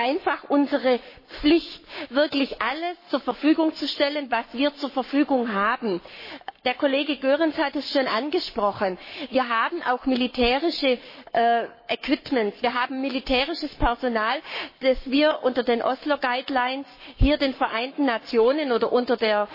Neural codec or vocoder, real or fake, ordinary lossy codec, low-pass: codec, 44.1 kHz, 7.8 kbps, Pupu-Codec; fake; MP3, 24 kbps; 5.4 kHz